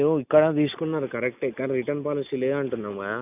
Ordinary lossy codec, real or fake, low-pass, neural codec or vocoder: none; real; 3.6 kHz; none